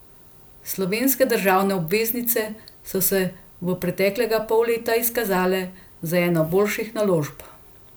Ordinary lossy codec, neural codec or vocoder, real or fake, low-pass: none; none; real; none